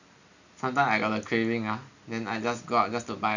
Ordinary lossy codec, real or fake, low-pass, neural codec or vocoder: none; real; 7.2 kHz; none